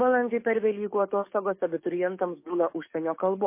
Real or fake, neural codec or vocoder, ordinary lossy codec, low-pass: fake; codec, 44.1 kHz, 7.8 kbps, DAC; MP3, 24 kbps; 3.6 kHz